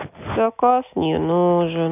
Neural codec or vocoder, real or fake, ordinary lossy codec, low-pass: none; real; none; 3.6 kHz